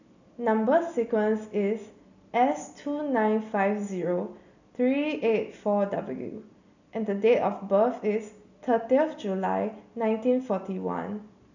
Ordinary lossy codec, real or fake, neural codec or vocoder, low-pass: none; real; none; 7.2 kHz